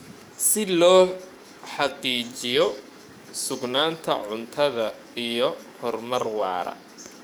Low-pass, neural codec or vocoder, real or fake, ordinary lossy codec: 19.8 kHz; codec, 44.1 kHz, 7.8 kbps, DAC; fake; none